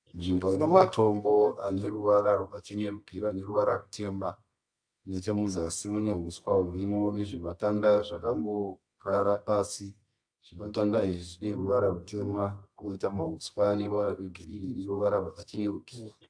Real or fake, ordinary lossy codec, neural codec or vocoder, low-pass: fake; AAC, 64 kbps; codec, 24 kHz, 0.9 kbps, WavTokenizer, medium music audio release; 9.9 kHz